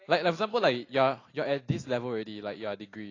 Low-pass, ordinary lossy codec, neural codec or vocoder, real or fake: 7.2 kHz; AAC, 32 kbps; none; real